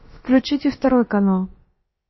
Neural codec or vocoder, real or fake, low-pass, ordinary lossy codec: codec, 16 kHz, about 1 kbps, DyCAST, with the encoder's durations; fake; 7.2 kHz; MP3, 24 kbps